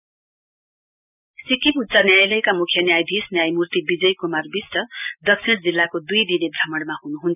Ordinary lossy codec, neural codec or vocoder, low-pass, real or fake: none; none; 3.6 kHz; real